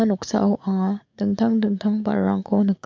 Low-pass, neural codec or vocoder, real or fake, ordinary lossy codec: 7.2 kHz; codec, 44.1 kHz, 7.8 kbps, DAC; fake; MP3, 64 kbps